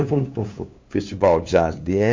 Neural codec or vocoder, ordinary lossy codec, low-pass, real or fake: codec, 16 kHz, 2 kbps, FunCodec, trained on LibriTTS, 25 frames a second; MP3, 48 kbps; 7.2 kHz; fake